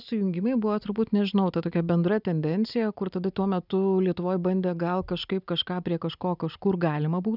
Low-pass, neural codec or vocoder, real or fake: 5.4 kHz; none; real